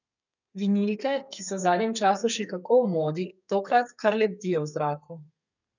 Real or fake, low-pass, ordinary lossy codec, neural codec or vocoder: fake; 7.2 kHz; none; codec, 32 kHz, 1.9 kbps, SNAC